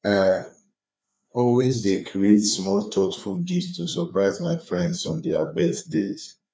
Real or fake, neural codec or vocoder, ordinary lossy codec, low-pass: fake; codec, 16 kHz, 2 kbps, FreqCodec, larger model; none; none